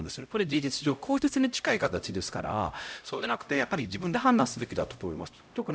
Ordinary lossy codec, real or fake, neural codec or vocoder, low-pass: none; fake; codec, 16 kHz, 0.5 kbps, X-Codec, HuBERT features, trained on LibriSpeech; none